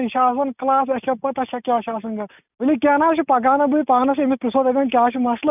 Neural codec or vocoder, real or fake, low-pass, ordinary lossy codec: none; real; 3.6 kHz; none